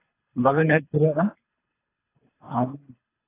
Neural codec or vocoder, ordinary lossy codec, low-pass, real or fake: codec, 24 kHz, 3 kbps, HILCodec; AAC, 16 kbps; 3.6 kHz; fake